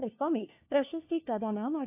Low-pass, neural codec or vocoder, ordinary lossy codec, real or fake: 3.6 kHz; codec, 16 kHz, 1 kbps, FunCodec, trained on LibriTTS, 50 frames a second; AAC, 32 kbps; fake